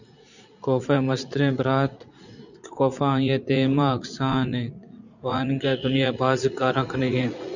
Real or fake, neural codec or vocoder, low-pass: fake; vocoder, 44.1 kHz, 80 mel bands, Vocos; 7.2 kHz